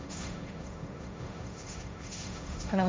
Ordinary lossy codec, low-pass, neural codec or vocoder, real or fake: none; none; codec, 16 kHz, 1.1 kbps, Voila-Tokenizer; fake